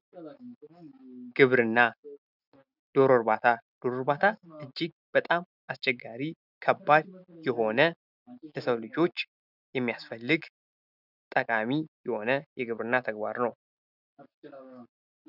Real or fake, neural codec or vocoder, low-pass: real; none; 5.4 kHz